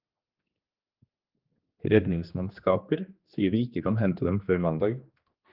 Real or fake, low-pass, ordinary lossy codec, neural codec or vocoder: fake; 5.4 kHz; Opus, 32 kbps; codec, 16 kHz, 2 kbps, X-Codec, HuBERT features, trained on general audio